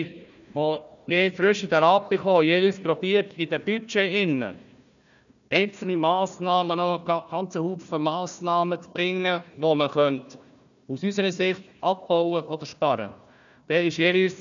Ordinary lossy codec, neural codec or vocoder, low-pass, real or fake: none; codec, 16 kHz, 1 kbps, FunCodec, trained on Chinese and English, 50 frames a second; 7.2 kHz; fake